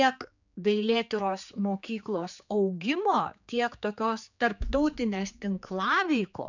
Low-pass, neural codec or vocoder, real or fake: 7.2 kHz; codec, 16 kHz, 4 kbps, X-Codec, HuBERT features, trained on general audio; fake